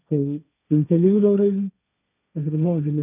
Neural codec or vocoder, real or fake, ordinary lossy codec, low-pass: codec, 16 kHz, 1.1 kbps, Voila-Tokenizer; fake; none; 3.6 kHz